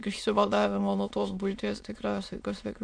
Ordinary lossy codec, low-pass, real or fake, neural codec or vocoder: MP3, 64 kbps; 9.9 kHz; fake; autoencoder, 22.05 kHz, a latent of 192 numbers a frame, VITS, trained on many speakers